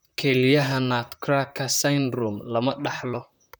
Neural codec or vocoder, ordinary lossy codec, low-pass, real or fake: vocoder, 44.1 kHz, 128 mel bands, Pupu-Vocoder; none; none; fake